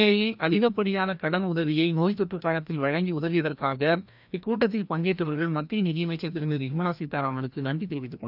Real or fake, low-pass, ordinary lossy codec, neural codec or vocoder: fake; 5.4 kHz; none; codec, 16 kHz, 1 kbps, FreqCodec, larger model